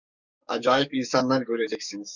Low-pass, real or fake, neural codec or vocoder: 7.2 kHz; fake; codec, 44.1 kHz, 7.8 kbps, DAC